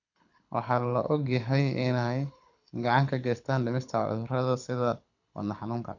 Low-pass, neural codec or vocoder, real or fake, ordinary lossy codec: 7.2 kHz; codec, 24 kHz, 6 kbps, HILCodec; fake; none